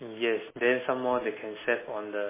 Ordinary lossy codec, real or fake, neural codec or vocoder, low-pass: MP3, 16 kbps; real; none; 3.6 kHz